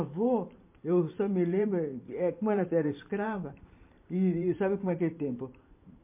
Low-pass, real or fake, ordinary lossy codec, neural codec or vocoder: 3.6 kHz; fake; MP3, 24 kbps; vocoder, 44.1 kHz, 128 mel bands every 512 samples, BigVGAN v2